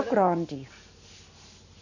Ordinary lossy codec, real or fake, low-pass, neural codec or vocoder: none; real; 7.2 kHz; none